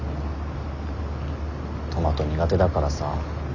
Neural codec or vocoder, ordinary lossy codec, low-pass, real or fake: none; Opus, 64 kbps; 7.2 kHz; real